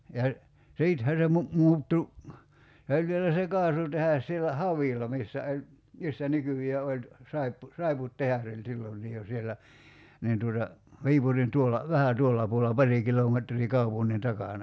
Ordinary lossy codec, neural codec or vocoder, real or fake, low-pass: none; none; real; none